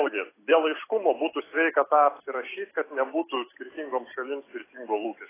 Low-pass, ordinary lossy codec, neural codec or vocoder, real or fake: 3.6 kHz; AAC, 16 kbps; none; real